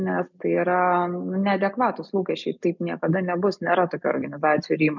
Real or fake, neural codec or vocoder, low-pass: real; none; 7.2 kHz